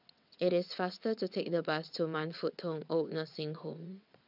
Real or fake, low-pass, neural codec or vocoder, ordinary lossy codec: fake; 5.4 kHz; vocoder, 22.05 kHz, 80 mel bands, WaveNeXt; none